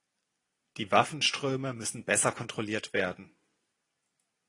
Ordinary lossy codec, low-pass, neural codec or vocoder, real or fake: AAC, 32 kbps; 10.8 kHz; none; real